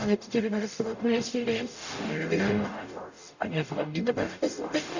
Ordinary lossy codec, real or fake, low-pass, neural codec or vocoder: none; fake; 7.2 kHz; codec, 44.1 kHz, 0.9 kbps, DAC